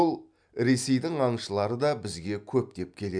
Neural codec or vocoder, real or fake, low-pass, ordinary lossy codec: none; real; 9.9 kHz; none